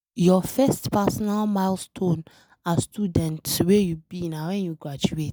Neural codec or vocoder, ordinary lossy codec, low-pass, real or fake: none; none; none; real